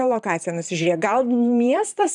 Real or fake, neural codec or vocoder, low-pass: fake; vocoder, 44.1 kHz, 128 mel bands, Pupu-Vocoder; 10.8 kHz